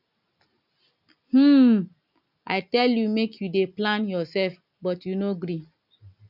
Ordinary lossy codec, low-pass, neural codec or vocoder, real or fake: none; 5.4 kHz; none; real